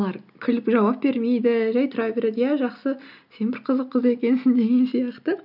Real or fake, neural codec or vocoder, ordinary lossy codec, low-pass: real; none; none; 5.4 kHz